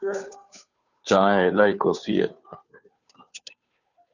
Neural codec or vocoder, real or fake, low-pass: codec, 16 kHz, 2 kbps, FunCodec, trained on Chinese and English, 25 frames a second; fake; 7.2 kHz